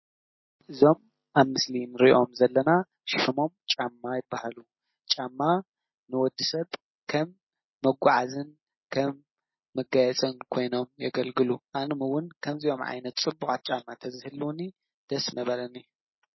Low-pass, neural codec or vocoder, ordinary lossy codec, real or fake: 7.2 kHz; none; MP3, 24 kbps; real